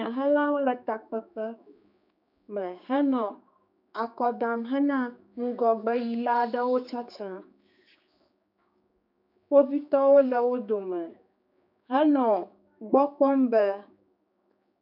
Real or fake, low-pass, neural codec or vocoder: fake; 5.4 kHz; codec, 16 kHz, 4 kbps, X-Codec, HuBERT features, trained on general audio